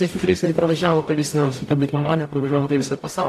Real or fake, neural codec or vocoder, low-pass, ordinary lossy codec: fake; codec, 44.1 kHz, 0.9 kbps, DAC; 14.4 kHz; AAC, 64 kbps